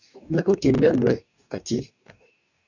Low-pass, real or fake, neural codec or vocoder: 7.2 kHz; fake; codec, 44.1 kHz, 2.6 kbps, DAC